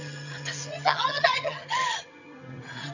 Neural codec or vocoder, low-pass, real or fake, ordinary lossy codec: vocoder, 22.05 kHz, 80 mel bands, HiFi-GAN; 7.2 kHz; fake; none